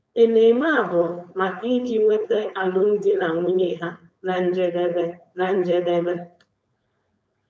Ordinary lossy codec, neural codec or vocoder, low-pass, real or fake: none; codec, 16 kHz, 4.8 kbps, FACodec; none; fake